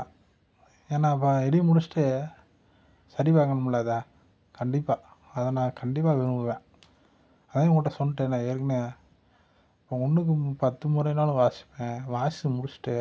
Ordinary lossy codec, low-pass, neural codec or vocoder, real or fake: none; none; none; real